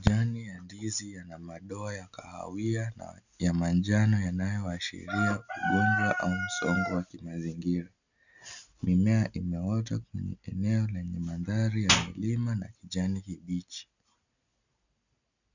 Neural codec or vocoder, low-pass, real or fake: none; 7.2 kHz; real